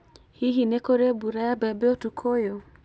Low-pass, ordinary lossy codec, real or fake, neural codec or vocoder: none; none; real; none